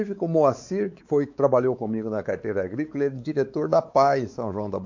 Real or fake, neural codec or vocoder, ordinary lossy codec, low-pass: fake; codec, 16 kHz, 4 kbps, X-Codec, WavLM features, trained on Multilingual LibriSpeech; AAC, 48 kbps; 7.2 kHz